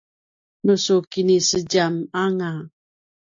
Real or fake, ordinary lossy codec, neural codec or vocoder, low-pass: real; AAC, 48 kbps; none; 7.2 kHz